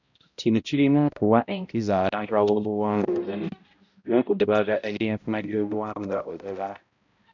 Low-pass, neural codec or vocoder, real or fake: 7.2 kHz; codec, 16 kHz, 0.5 kbps, X-Codec, HuBERT features, trained on balanced general audio; fake